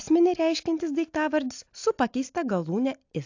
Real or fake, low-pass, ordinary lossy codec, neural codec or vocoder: real; 7.2 kHz; AAC, 48 kbps; none